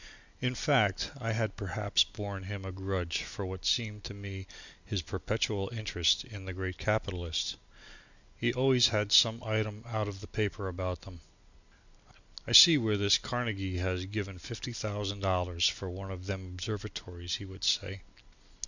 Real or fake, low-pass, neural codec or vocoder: real; 7.2 kHz; none